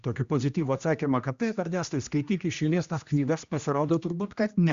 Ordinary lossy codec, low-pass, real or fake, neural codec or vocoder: Opus, 64 kbps; 7.2 kHz; fake; codec, 16 kHz, 1 kbps, X-Codec, HuBERT features, trained on general audio